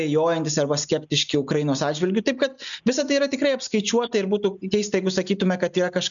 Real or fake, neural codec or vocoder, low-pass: real; none; 7.2 kHz